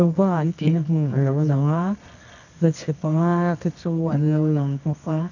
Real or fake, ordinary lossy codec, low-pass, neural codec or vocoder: fake; none; 7.2 kHz; codec, 24 kHz, 0.9 kbps, WavTokenizer, medium music audio release